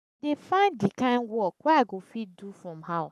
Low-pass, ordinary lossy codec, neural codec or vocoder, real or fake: 14.4 kHz; none; codec, 44.1 kHz, 7.8 kbps, Pupu-Codec; fake